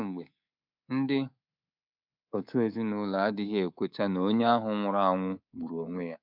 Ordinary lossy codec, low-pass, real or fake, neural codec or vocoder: none; 5.4 kHz; fake; codec, 24 kHz, 3.1 kbps, DualCodec